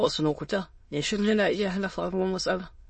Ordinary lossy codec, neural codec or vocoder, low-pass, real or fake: MP3, 32 kbps; autoencoder, 22.05 kHz, a latent of 192 numbers a frame, VITS, trained on many speakers; 9.9 kHz; fake